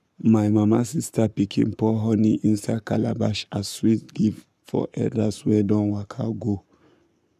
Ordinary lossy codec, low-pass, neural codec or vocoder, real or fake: none; 14.4 kHz; codec, 44.1 kHz, 7.8 kbps, Pupu-Codec; fake